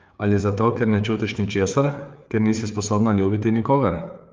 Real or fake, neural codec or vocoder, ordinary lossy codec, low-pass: fake; codec, 16 kHz, 4 kbps, FreqCodec, larger model; Opus, 32 kbps; 7.2 kHz